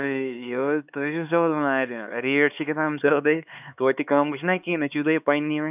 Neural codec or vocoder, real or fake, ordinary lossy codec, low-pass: codec, 16 kHz, 4 kbps, X-Codec, HuBERT features, trained on LibriSpeech; fake; none; 3.6 kHz